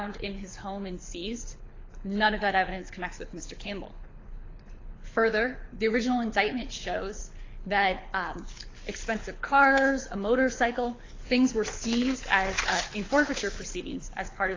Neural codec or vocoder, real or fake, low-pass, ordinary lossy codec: codec, 24 kHz, 6 kbps, HILCodec; fake; 7.2 kHz; AAC, 32 kbps